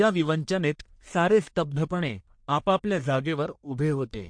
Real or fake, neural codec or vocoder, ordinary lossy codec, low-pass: fake; codec, 44.1 kHz, 1.7 kbps, Pupu-Codec; MP3, 48 kbps; 9.9 kHz